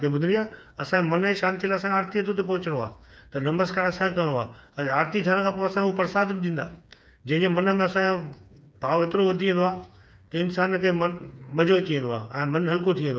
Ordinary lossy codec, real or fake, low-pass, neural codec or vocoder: none; fake; none; codec, 16 kHz, 4 kbps, FreqCodec, smaller model